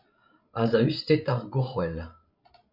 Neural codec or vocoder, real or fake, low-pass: codec, 16 kHz, 16 kbps, FreqCodec, larger model; fake; 5.4 kHz